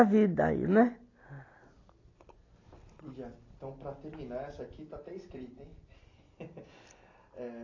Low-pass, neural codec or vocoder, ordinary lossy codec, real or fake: 7.2 kHz; none; AAC, 32 kbps; real